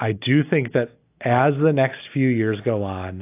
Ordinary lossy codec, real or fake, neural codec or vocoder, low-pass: AAC, 24 kbps; real; none; 3.6 kHz